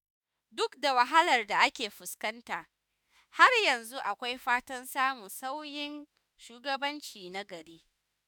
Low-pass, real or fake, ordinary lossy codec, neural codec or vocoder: none; fake; none; autoencoder, 48 kHz, 32 numbers a frame, DAC-VAE, trained on Japanese speech